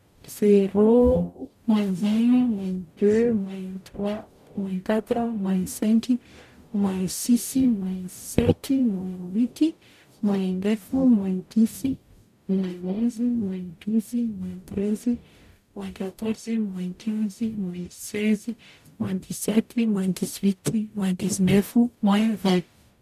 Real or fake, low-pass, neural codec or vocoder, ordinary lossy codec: fake; 14.4 kHz; codec, 44.1 kHz, 0.9 kbps, DAC; none